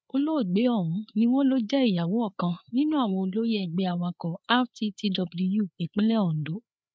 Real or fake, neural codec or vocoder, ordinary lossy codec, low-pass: fake; codec, 16 kHz, 4 kbps, FreqCodec, larger model; none; none